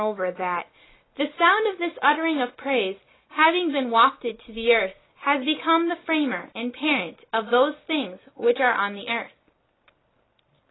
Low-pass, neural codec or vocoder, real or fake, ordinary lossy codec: 7.2 kHz; none; real; AAC, 16 kbps